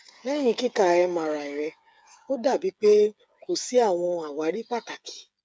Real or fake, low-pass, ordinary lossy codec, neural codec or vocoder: fake; none; none; codec, 16 kHz, 8 kbps, FreqCodec, smaller model